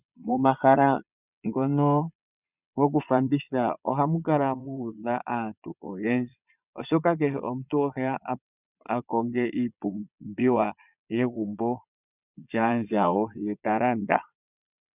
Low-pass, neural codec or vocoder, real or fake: 3.6 kHz; vocoder, 22.05 kHz, 80 mel bands, Vocos; fake